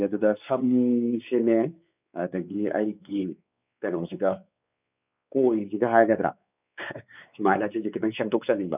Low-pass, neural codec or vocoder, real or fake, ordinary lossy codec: 3.6 kHz; codec, 16 kHz, 4 kbps, X-Codec, WavLM features, trained on Multilingual LibriSpeech; fake; none